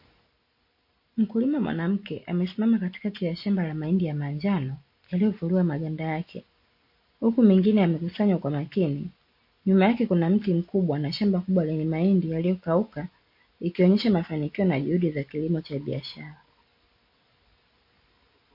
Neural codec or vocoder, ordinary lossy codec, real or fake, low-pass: none; MP3, 32 kbps; real; 5.4 kHz